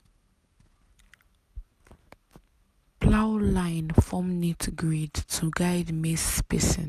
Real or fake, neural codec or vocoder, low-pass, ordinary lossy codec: real; none; 14.4 kHz; none